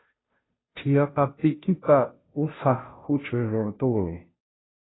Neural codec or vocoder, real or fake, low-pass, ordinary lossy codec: codec, 16 kHz, 0.5 kbps, FunCodec, trained on Chinese and English, 25 frames a second; fake; 7.2 kHz; AAC, 16 kbps